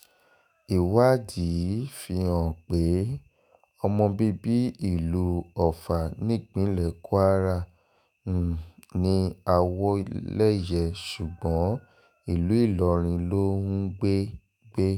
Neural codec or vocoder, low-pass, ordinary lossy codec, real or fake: autoencoder, 48 kHz, 128 numbers a frame, DAC-VAE, trained on Japanese speech; 19.8 kHz; none; fake